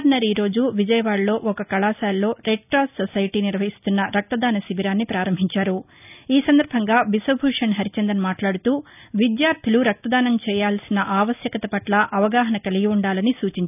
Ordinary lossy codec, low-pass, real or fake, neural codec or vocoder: none; 3.6 kHz; real; none